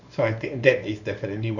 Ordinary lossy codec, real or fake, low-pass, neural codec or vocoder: none; fake; 7.2 kHz; codec, 24 kHz, 0.9 kbps, WavTokenizer, small release